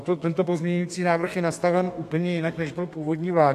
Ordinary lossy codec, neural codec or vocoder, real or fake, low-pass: AAC, 64 kbps; codec, 32 kHz, 1.9 kbps, SNAC; fake; 14.4 kHz